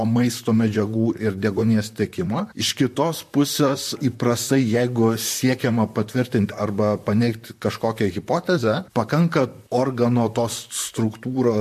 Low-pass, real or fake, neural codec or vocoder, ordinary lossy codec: 14.4 kHz; fake; vocoder, 44.1 kHz, 128 mel bands, Pupu-Vocoder; MP3, 64 kbps